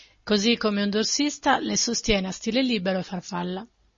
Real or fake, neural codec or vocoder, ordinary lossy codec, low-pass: real; none; MP3, 32 kbps; 7.2 kHz